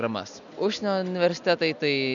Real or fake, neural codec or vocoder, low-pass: real; none; 7.2 kHz